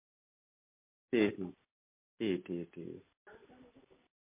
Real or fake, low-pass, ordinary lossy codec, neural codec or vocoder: real; 3.6 kHz; MP3, 24 kbps; none